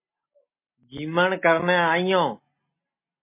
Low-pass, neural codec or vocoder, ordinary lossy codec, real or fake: 3.6 kHz; none; MP3, 24 kbps; real